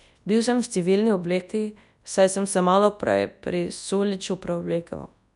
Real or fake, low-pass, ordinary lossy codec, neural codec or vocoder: fake; 10.8 kHz; none; codec, 24 kHz, 0.9 kbps, WavTokenizer, large speech release